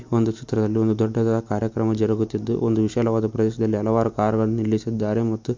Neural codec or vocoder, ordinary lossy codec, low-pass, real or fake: none; MP3, 48 kbps; 7.2 kHz; real